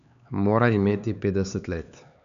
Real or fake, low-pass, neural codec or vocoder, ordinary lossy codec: fake; 7.2 kHz; codec, 16 kHz, 4 kbps, X-Codec, HuBERT features, trained on LibriSpeech; none